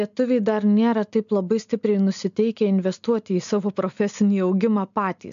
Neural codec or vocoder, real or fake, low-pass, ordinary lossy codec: none; real; 7.2 kHz; AAC, 96 kbps